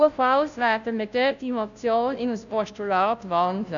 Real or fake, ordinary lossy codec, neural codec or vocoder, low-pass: fake; none; codec, 16 kHz, 0.5 kbps, FunCodec, trained on Chinese and English, 25 frames a second; 7.2 kHz